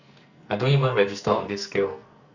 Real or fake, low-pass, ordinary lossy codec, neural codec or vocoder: fake; 7.2 kHz; Opus, 64 kbps; codec, 44.1 kHz, 2.6 kbps, SNAC